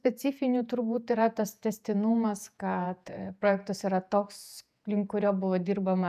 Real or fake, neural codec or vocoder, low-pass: fake; vocoder, 48 kHz, 128 mel bands, Vocos; 14.4 kHz